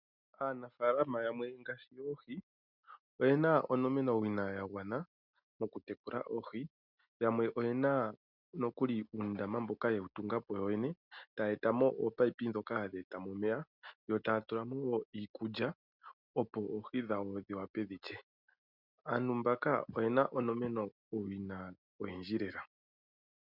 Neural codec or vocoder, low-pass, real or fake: none; 5.4 kHz; real